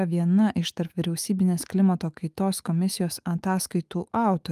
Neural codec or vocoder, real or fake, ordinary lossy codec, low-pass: autoencoder, 48 kHz, 128 numbers a frame, DAC-VAE, trained on Japanese speech; fake; Opus, 32 kbps; 14.4 kHz